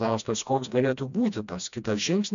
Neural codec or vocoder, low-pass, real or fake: codec, 16 kHz, 1 kbps, FreqCodec, smaller model; 7.2 kHz; fake